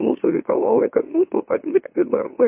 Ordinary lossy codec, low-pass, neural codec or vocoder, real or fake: MP3, 24 kbps; 3.6 kHz; autoencoder, 44.1 kHz, a latent of 192 numbers a frame, MeloTTS; fake